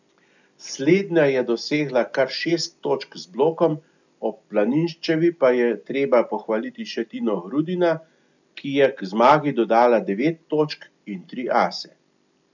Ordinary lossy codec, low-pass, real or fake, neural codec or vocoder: none; 7.2 kHz; real; none